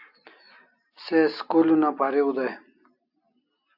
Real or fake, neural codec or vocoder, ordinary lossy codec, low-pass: real; none; AAC, 48 kbps; 5.4 kHz